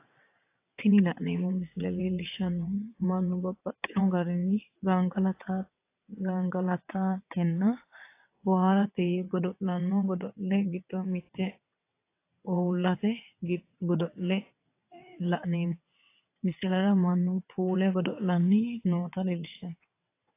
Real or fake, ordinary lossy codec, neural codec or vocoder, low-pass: fake; AAC, 24 kbps; vocoder, 22.05 kHz, 80 mel bands, WaveNeXt; 3.6 kHz